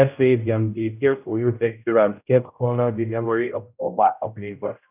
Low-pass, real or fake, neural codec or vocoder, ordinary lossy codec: 3.6 kHz; fake; codec, 16 kHz, 0.5 kbps, X-Codec, HuBERT features, trained on general audio; none